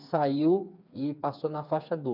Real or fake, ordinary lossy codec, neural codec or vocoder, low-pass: fake; none; codec, 16 kHz, 4 kbps, FreqCodec, smaller model; 5.4 kHz